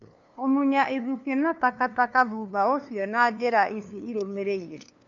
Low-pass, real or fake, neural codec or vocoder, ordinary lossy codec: 7.2 kHz; fake; codec, 16 kHz, 2 kbps, FunCodec, trained on LibriTTS, 25 frames a second; MP3, 64 kbps